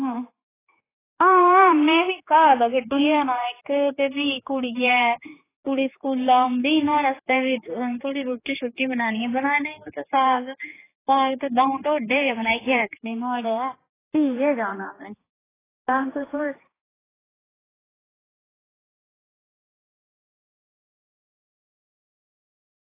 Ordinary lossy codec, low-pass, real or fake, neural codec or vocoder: AAC, 16 kbps; 3.6 kHz; fake; codec, 16 kHz, 4 kbps, X-Codec, HuBERT features, trained on general audio